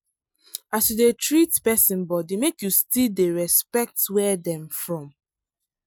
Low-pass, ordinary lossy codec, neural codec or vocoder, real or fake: none; none; none; real